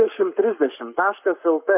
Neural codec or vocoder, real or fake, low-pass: codec, 44.1 kHz, 7.8 kbps, Pupu-Codec; fake; 3.6 kHz